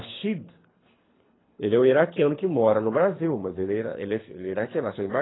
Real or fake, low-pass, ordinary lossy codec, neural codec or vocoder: fake; 7.2 kHz; AAC, 16 kbps; codec, 24 kHz, 3 kbps, HILCodec